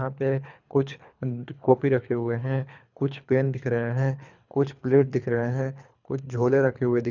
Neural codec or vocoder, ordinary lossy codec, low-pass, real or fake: codec, 24 kHz, 3 kbps, HILCodec; none; 7.2 kHz; fake